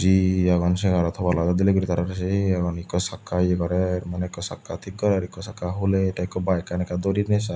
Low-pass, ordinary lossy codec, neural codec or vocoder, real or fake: none; none; none; real